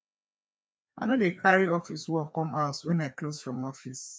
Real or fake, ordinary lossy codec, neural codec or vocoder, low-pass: fake; none; codec, 16 kHz, 2 kbps, FreqCodec, larger model; none